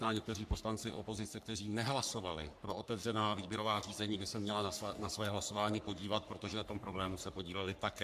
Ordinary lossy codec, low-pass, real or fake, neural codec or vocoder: AAC, 96 kbps; 14.4 kHz; fake; codec, 44.1 kHz, 3.4 kbps, Pupu-Codec